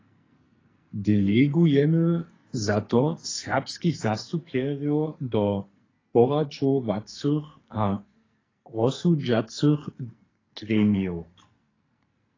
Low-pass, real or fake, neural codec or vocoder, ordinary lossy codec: 7.2 kHz; fake; codec, 44.1 kHz, 2.6 kbps, SNAC; AAC, 32 kbps